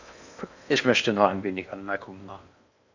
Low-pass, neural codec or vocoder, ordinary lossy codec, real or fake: 7.2 kHz; codec, 16 kHz in and 24 kHz out, 0.8 kbps, FocalCodec, streaming, 65536 codes; none; fake